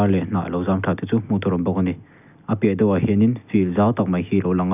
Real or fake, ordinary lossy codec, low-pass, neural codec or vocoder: real; none; 3.6 kHz; none